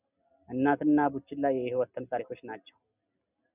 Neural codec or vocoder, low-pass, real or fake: none; 3.6 kHz; real